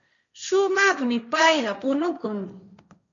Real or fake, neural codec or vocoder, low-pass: fake; codec, 16 kHz, 1.1 kbps, Voila-Tokenizer; 7.2 kHz